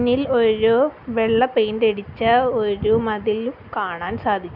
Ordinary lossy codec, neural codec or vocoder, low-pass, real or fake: none; none; 5.4 kHz; real